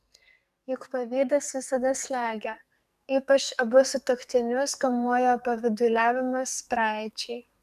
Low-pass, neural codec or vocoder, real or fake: 14.4 kHz; codec, 44.1 kHz, 2.6 kbps, SNAC; fake